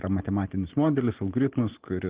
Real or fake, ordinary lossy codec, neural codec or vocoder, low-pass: real; Opus, 16 kbps; none; 3.6 kHz